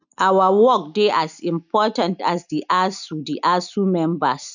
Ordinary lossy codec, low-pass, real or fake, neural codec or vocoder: none; 7.2 kHz; real; none